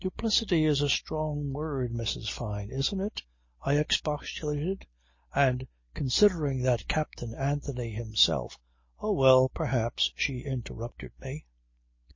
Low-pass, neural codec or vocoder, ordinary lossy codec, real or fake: 7.2 kHz; none; MP3, 32 kbps; real